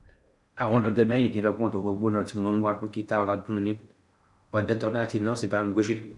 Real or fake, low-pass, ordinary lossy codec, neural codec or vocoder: fake; 10.8 kHz; none; codec, 16 kHz in and 24 kHz out, 0.6 kbps, FocalCodec, streaming, 4096 codes